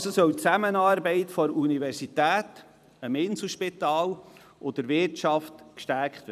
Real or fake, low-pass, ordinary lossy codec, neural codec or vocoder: fake; 14.4 kHz; none; vocoder, 44.1 kHz, 128 mel bands every 256 samples, BigVGAN v2